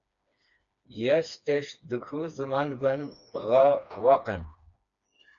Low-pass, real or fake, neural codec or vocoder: 7.2 kHz; fake; codec, 16 kHz, 2 kbps, FreqCodec, smaller model